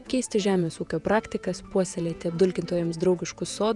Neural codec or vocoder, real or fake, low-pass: vocoder, 48 kHz, 128 mel bands, Vocos; fake; 10.8 kHz